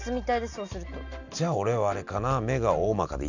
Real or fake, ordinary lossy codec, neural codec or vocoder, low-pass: real; none; none; 7.2 kHz